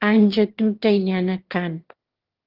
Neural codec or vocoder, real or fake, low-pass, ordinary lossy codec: autoencoder, 22.05 kHz, a latent of 192 numbers a frame, VITS, trained on one speaker; fake; 5.4 kHz; Opus, 16 kbps